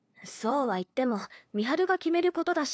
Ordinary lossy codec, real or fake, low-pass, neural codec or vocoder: none; fake; none; codec, 16 kHz, 2 kbps, FunCodec, trained on LibriTTS, 25 frames a second